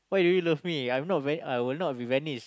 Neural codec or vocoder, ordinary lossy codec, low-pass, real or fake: none; none; none; real